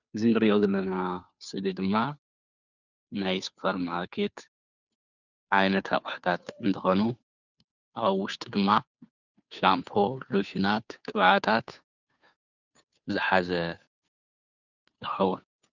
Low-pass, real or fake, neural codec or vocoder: 7.2 kHz; fake; codec, 16 kHz, 2 kbps, FunCodec, trained on Chinese and English, 25 frames a second